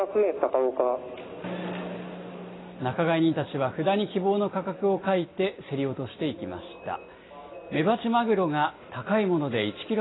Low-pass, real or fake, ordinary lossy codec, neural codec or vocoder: 7.2 kHz; real; AAC, 16 kbps; none